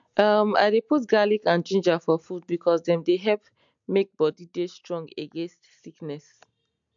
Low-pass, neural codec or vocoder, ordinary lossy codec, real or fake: 7.2 kHz; none; MP3, 64 kbps; real